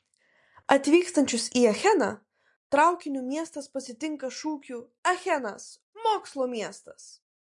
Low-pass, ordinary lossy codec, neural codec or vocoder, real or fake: 10.8 kHz; MP3, 48 kbps; none; real